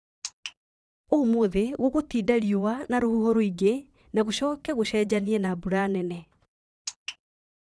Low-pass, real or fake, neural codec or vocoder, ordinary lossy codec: none; fake; vocoder, 22.05 kHz, 80 mel bands, Vocos; none